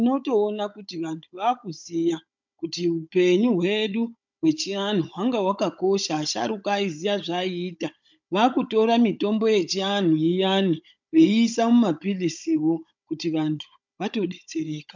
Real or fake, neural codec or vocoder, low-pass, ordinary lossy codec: fake; codec, 16 kHz, 16 kbps, FunCodec, trained on Chinese and English, 50 frames a second; 7.2 kHz; MP3, 64 kbps